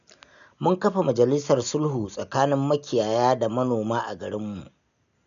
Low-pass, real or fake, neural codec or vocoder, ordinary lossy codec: 7.2 kHz; real; none; none